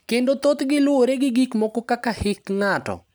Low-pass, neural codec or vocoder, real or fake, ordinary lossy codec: none; none; real; none